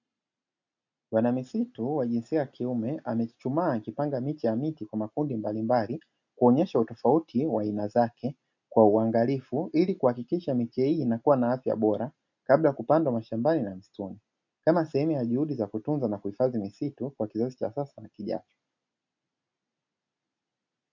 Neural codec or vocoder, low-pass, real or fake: none; 7.2 kHz; real